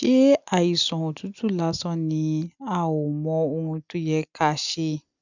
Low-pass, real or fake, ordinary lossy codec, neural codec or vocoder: 7.2 kHz; real; none; none